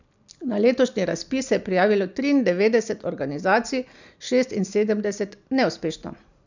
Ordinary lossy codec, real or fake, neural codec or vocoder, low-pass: none; real; none; 7.2 kHz